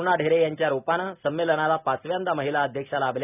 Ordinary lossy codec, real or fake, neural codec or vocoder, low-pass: none; real; none; 3.6 kHz